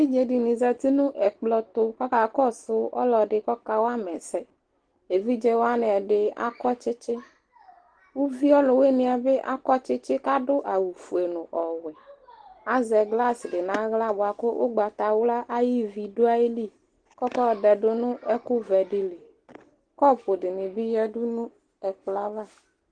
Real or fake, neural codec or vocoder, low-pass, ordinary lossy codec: real; none; 9.9 kHz; Opus, 16 kbps